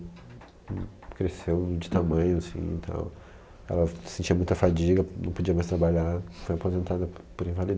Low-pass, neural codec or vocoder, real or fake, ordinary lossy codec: none; none; real; none